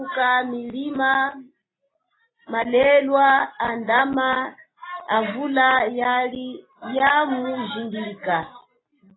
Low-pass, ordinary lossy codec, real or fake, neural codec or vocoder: 7.2 kHz; AAC, 16 kbps; real; none